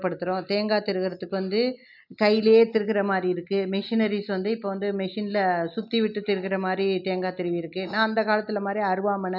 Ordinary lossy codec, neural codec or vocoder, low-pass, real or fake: none; none; 5.4 kHz; real